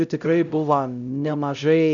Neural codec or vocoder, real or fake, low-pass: codec, 16 kHz, 0.5 kbps, X-Codec, HuBERT features, trained on LibriSpeech; fake; 7.2 kHz